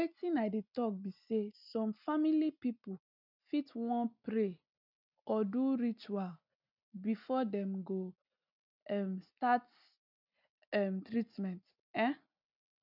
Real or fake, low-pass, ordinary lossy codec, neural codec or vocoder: real; 5.4 kHz; none; none